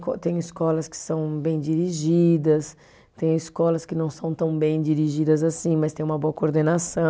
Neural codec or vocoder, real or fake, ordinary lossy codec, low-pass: none; real; none; none